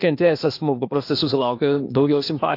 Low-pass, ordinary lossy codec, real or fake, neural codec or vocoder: 5.4 kHz; AAC, 32 kbps; fake; codec, 16 kHz, 0.8 kbps, ZipCodec